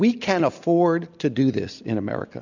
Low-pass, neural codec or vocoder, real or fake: 7.2 kHz; none; real